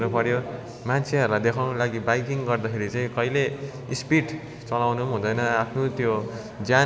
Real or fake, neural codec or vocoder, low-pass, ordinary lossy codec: real; none; none; none